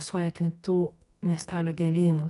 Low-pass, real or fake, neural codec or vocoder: 10.8 kHz; fake; codec, 24 kHz, 0.9 kbps, WavTokenizer, medium music audio release